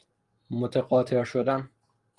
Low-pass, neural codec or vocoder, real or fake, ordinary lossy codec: 10.8 kHz; none; real; Opus, 24 kbps